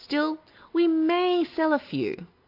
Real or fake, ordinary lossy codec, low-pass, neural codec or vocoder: real; AAC, 32 kbps; 5.4 kHz; none